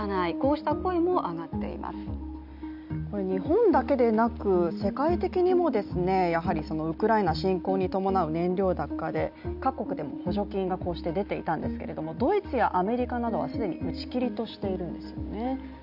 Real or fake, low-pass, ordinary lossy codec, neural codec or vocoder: real; 5.4 kHz; none; none